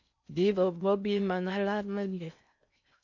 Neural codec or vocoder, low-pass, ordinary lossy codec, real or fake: codec, 16 kHz in and 24 kHz out, 0.6 kbps, FocalCodec, streaming, 4096 codes; 7.2 kHz; AAC, 48 kbps; fake